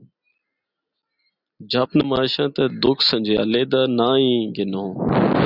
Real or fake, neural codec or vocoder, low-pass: real; none; 5.4 kHz